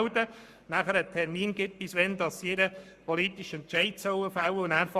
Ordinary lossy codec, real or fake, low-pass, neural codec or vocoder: Opus, 64 kbps; fake; 14.4 kHz; codec, 44.1 kHz, 7.8 kbps, Pupu-Codec